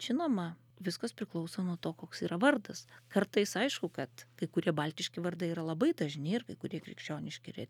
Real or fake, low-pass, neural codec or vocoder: real; 19.8 kHz; none